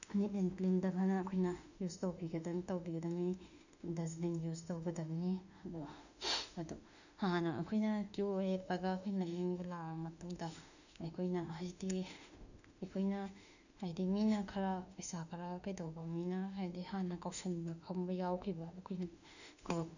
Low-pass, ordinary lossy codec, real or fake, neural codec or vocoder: 7.2 kHz; none; fake; autoencoder, 48 kHz, 32 numbers a frame, DAC-VAE, trained on Japanese speech